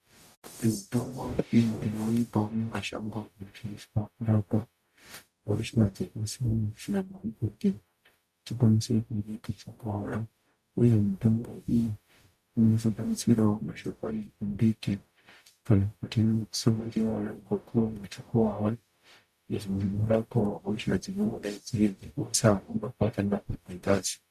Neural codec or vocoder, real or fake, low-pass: codec, 44.1 kHz, 0.9 kbps, DAC; fake; 14.4 kHz